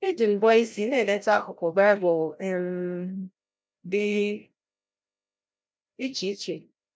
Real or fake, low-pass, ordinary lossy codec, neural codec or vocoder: fake; none; none; codec, 16 kHz, 0.5 kbps, FreqCodec, larger model